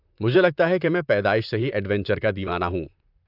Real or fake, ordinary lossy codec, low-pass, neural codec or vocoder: fake; none; 5.4 kHz; vocoder, 44.1 kHz, 128 mel bands, Pupu-Vocoder